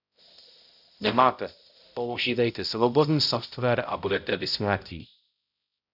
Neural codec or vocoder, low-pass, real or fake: codec, 16 kHz, 0.5 kbps, X-Codec, HuBERT features, trained on balanced general audio; 5.4 kHz; fake